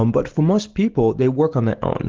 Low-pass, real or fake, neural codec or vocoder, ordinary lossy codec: 7.2 kHz; real; none; Opus, 16 kbps